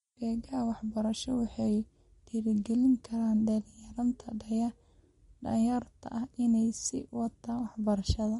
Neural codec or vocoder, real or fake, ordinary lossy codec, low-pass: none; real; MP3, 48 kbps; 14.4 kHz